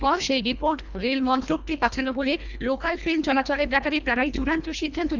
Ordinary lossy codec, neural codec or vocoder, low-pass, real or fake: none; codec, 24 kHz, 1.5 kbps, HILCodec; 7.2 kHz; fake